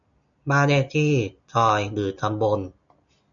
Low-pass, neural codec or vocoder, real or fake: 7.2 kHz; none; real